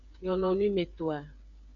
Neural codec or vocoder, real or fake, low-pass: codec, 16 kHz, 8 kbps, FreqCodec, smaller model; fake; 7.2 kHz